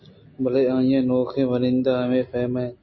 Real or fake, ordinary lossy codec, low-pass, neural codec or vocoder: real; MP3, 24 kbps; 7.2 kHz; none